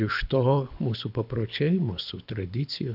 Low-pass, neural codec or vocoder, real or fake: 5.4 kHz; codec, 24 kHz, 6 kbps, HILCodec; fake